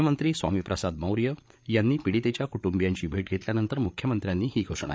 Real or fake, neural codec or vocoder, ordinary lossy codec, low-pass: fake; codec, 16 kHz, 8 kbps, FreqCodec, larger model; none; none